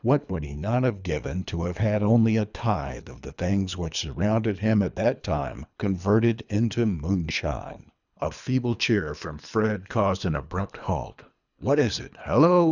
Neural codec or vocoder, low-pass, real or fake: codec, 24 kHz, 3 kbps, HILCodec; 7.2 kHz; fake